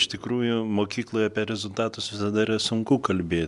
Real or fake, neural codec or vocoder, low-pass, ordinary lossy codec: real; none; 10.8 kHz; MP3, 96 kbps